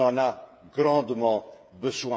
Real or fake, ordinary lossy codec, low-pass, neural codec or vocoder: fake; none; none; codec, 16 kHz, 8 kbps, FreqCodec, smaller model